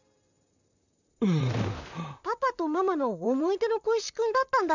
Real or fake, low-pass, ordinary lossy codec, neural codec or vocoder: fake; 7.2 kHz; none; vocoder, 44.1 kHz, 128 mel bands, Pupu-Vocoder